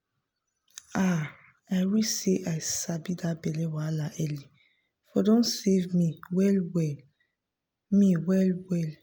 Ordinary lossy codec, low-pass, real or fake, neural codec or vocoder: none; none; real; none